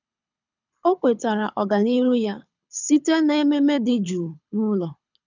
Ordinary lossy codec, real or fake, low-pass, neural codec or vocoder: none; fake; 7.2 kHz; codec, 24 kHz, 6 kbps, HILCodec